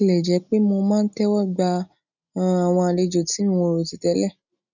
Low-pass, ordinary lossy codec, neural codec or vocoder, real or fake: 7.2 kHz; none; none; real